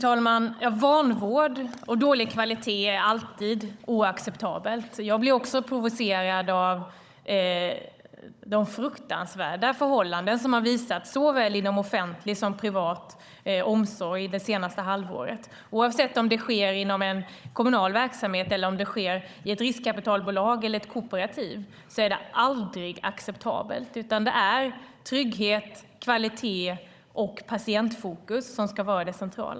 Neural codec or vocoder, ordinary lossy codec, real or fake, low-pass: codec, 16 kHz, 16 kbps, FunCodec, trained on Chinese and English, 50 frames a second; none; fake; none